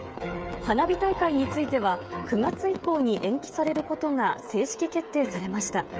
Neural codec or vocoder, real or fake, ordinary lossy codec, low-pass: codec, 16 kHz, 8 kbps, FreqCodec, smaller model; fake; none; none